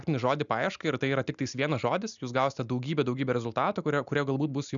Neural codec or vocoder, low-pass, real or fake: none; 7.2 kHz; real